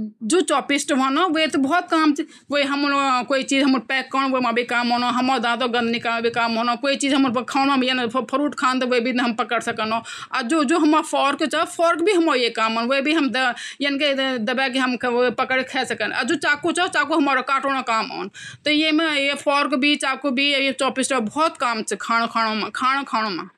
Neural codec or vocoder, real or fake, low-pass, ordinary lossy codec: none; real; 14.4 kHz; none